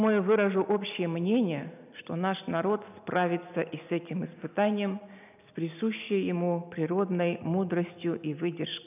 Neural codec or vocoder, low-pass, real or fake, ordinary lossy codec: none; 3.6 kHz; real; none